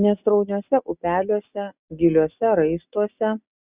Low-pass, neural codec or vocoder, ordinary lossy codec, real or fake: 3.6 kHz; none; Opus, 64 kbps; real